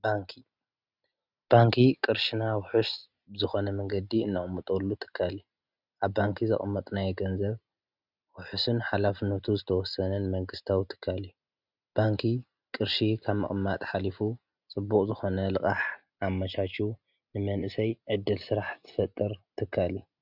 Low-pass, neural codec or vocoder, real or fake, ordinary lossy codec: 5.4 kHz; none; real; Opus, 64 kbps